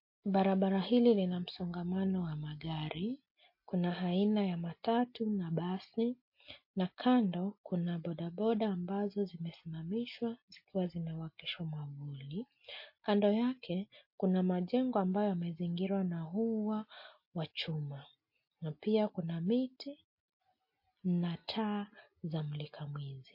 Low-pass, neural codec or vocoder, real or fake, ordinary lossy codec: 5.4 kHz; none; real; MP3, 32 kbps